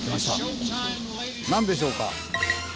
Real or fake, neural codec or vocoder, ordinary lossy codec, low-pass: real; none; none; none